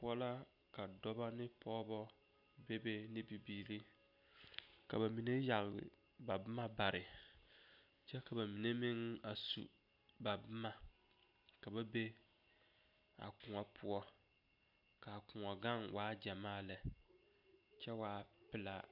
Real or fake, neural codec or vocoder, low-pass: real; none; 5.4 kHz